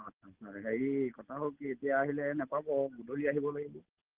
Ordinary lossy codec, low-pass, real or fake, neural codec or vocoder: Opus, 16 kbps; 3.6 kHz; real; none